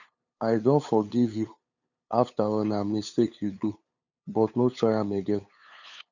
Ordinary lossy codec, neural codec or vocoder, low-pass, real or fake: none; codec, 16 kHz, 8 kbps, FunCodec, trained on LibriTTS, 25 frames a second; 7.2 kHz; fake